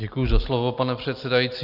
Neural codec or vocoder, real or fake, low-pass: none; real; 5.4 kHz